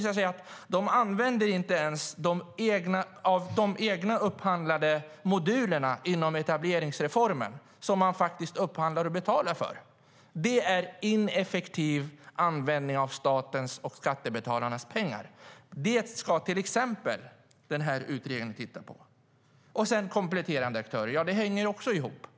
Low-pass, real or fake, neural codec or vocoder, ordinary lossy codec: none; real; none; none